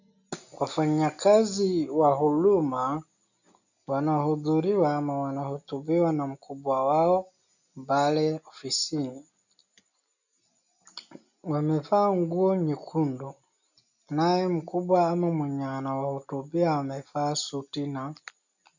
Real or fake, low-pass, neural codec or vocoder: real; 7.2 kHz; none